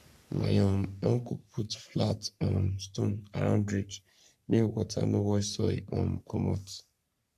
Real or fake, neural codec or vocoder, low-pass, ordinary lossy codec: fake; codec, 44.1 kHz, 3.4 kbps, Pupu-Codec; 14.4 kHz; none